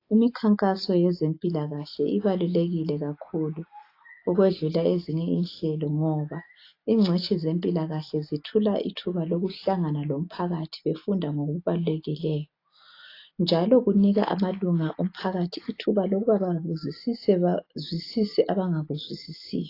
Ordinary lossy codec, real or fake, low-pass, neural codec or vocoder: AAC, 32 kbps; real; 5.4 kHz; none